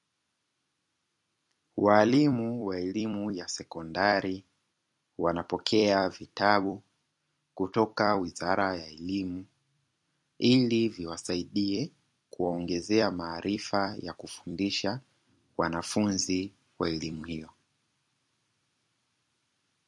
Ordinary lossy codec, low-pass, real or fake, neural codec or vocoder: MP3, 48 kbps; 10.8 kHz; fake; vocoder, 44.1 kHz, 128 mel bands every 256 samples, BigVGAN v2